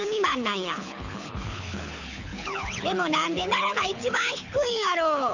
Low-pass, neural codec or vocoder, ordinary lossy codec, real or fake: 7.2 kHz; codec, 24 kHz, 6 kbps, HILCodec; none; fake